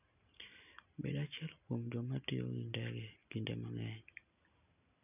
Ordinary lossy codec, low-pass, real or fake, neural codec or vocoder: none; 3.6 kHz; real; none